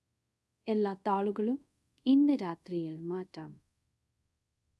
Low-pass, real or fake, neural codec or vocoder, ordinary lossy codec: none; fake; codec, 24 kHz, 0.5 kbps, DualCodec; none